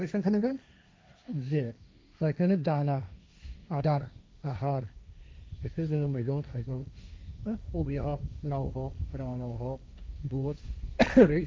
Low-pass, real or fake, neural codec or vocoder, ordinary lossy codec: none; fake; codec, 16 kHz, 1.1 kbps, Voila-Tokenizer; none